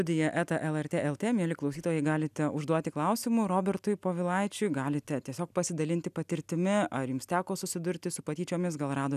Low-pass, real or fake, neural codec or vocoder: 14.4 kHz; real; none